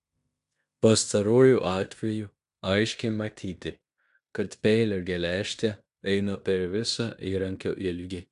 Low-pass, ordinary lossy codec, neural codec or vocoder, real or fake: 10.8 kHz; AAC, 96 kbps; codec, 16 kHz in and 24 kHz out, 0.9 kbps, LongCat-Audio-Codec, fine tuned four codebook decoder; fake